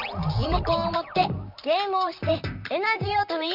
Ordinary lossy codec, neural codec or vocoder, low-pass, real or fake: none; vocoder, 44.1 kHz, 128 mel bands, Pupu-Vocoder; 5.4 kHz; fake